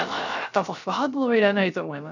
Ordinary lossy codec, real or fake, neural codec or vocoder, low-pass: none; fake; codec, 16 kHz, 0.3 kbps, FocalCodec; 7.2 kHz